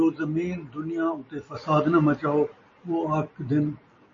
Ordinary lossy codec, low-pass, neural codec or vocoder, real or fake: MP3, 32 kbps; 7.2 kHz; none; real